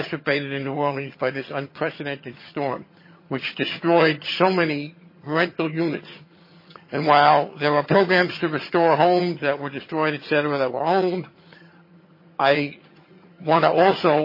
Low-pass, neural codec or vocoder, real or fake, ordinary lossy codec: 5.4 kHz; vocoder, 22.05 kHz, 80 mel bands, HiFi-GAN; fake; MP3, 24 kbps